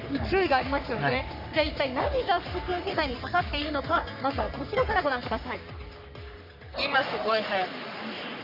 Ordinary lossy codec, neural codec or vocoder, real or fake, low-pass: none; codec, 44.1 kHz, 3.4 kbps, Pupu-Codec; fake; 5.4 kHz